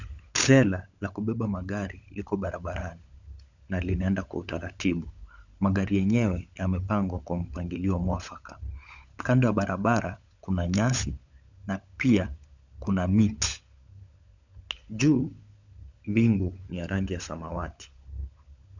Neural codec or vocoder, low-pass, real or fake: codec, 16 kHz, 16 kbps, FunCodec, trained on LibriTTS, 50 frames a second; 7.2 kHz; fake